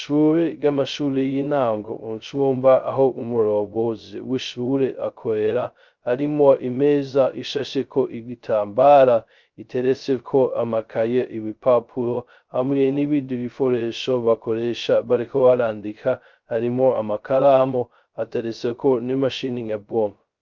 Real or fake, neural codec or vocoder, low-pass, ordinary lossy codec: fake; codec, 16 kHz, 0.2 kbps, FocalCodec; 7.2 kHz; Opus, 24 kbps